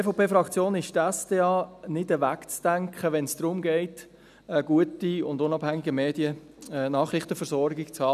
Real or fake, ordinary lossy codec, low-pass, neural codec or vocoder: real; none; 14.4 kHz; none